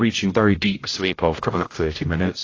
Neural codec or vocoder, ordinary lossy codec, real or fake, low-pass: codec, 16 kHz, 0.5 kbps, X-Codec, HuBERT features, trained on general audio; AAC, 32 kbps; fake; 7.2 kHz